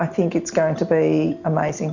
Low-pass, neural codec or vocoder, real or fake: 7.2 kHz; none; real